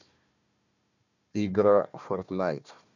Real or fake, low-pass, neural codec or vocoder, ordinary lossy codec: fake; 7.2 kHz; codec, 16 kHz, 1 kbps, FunCodec, trained on Chinese and English, 50 frames a second; none